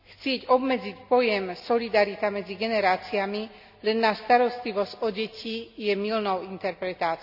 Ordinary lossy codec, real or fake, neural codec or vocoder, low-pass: none; real; none; 5.4 kHz